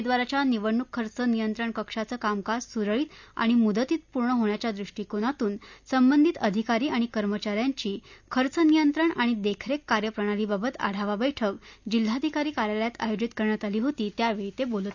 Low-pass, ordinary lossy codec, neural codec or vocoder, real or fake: 7.2 kHz; none; none; real